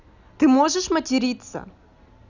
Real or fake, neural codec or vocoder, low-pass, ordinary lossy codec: real; none; 7.2 kHz; none